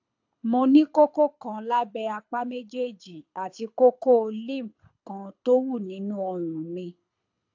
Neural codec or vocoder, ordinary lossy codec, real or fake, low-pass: codec, 24 kHz, 6 kbps, HILCodec; AAC, 48 kbps; fake; 7.2 kHz